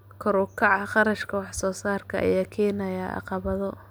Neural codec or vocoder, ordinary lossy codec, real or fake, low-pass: none; none; real; none